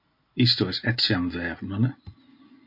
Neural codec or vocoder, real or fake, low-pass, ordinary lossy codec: none; real; 5.4 kHz; MP3, 32 kbps